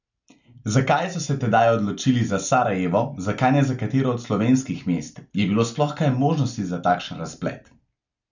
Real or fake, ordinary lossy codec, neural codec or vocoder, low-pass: real; none; none; 7.2 kHz